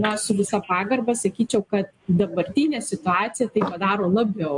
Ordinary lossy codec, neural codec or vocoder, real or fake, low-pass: MP3, 64 kbps; none; real; 10.8 kHz